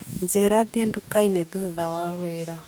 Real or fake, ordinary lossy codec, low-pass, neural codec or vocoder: fake; none; none; codec, 44.1 kHz, 2.6 kbps, DAC